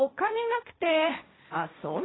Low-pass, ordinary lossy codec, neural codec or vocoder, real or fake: 7.2 kHz; AAC, 16 kbps; codec, 16 kHz, 1.1 kbps, Voila-Tokenizer; fake